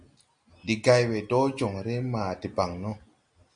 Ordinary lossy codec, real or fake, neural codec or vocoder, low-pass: Opus, 64 kbps; real; none; 9.9 kHz